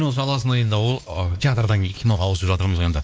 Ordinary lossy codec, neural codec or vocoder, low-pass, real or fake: none; codec, 16 kHz, 2 kbps, X-Codec, WavLM features, trained on Multilingual LibriSpeech; none; fake